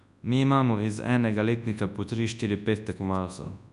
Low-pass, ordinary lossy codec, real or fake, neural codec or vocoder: 10.8 kHz; none; fake; codec, 24 kHz, 0.9 kbps, WavTokenizer, large speech release